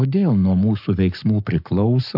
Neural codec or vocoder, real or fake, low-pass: codec, 44.1 kHz, 7.8 kbps, Pupu-Codec; fake; 5.4 kHz